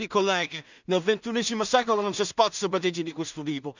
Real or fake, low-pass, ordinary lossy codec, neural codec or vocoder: fake; 7.2 kHz; none; codec, 16 kHz in and 24 kHz out, 0.4 kbps, LongCat-Audio-Codec, two codebook decoder